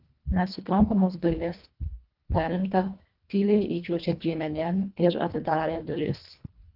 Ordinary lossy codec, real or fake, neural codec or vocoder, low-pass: Opus, 24 kbps; fake; codec, 24 kHz, 1.5 kbps, HILCodec; 5.4 kHz